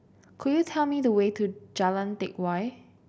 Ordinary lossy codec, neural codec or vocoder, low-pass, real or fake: none; none; none; real